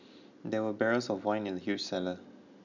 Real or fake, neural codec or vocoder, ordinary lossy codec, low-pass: real; none; none; 7.2 kHz